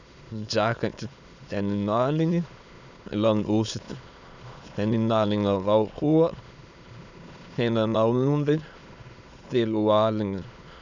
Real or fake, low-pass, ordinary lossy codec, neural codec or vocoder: fake; 7.2 kHz; none; autoencoder, 22.05 kHz, a latent of 192 numbers a frame, VITS, trained on many speakers